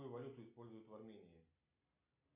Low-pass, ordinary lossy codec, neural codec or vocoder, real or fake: 3.6 kHz; MP3, 32 kbps; none; real